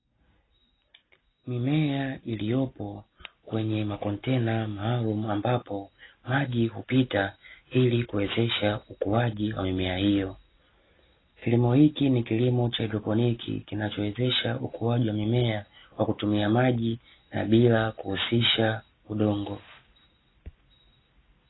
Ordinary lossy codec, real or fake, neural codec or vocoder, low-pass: AAC, 16 kbps; real; none; 7.2 kHz